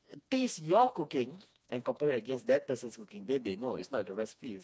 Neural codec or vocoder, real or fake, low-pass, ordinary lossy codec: codec, 16 kHz, 2 kbps, FreqCodec, smaller model; fake; none; none